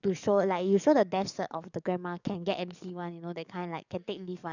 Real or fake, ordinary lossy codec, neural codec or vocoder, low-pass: fake; none; codec, 44.1 kHz, 7.8 kbps, DAC; 7.2 kHz